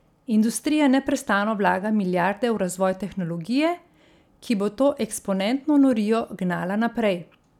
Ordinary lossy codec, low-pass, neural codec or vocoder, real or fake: none; 19.8 kHz; none; real